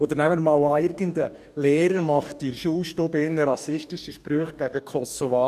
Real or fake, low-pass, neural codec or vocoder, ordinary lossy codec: fake; 14.4 kHz; codec, 44.1 kHz, 2.6 kbps, DAC; none